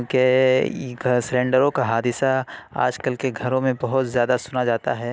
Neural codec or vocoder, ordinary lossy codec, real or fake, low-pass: none; none; real; none